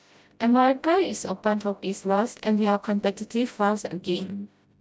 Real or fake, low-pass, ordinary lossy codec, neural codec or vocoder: fake; none; none; codec, 16 kHz, 0.5 kbps, FreqCodec, smaller model